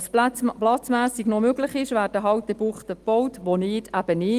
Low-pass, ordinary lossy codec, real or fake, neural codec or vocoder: 14.4 kHz; Opus, 24 kbps; real; none